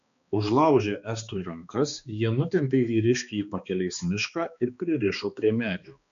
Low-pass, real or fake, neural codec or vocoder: 7.2 kHz; fake; codec, 16 kHz, 2 kbps, X-Codec, HuBERT features, trained on balanced general audio